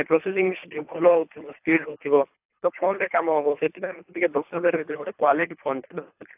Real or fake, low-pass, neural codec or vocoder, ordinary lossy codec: fake; 3.6 kHz; codec, 24 kHz, 3 kbps, HILCodec; AAC, 32 kbps